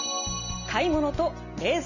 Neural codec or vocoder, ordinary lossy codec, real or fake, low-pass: none; none; real; 7.2 kHz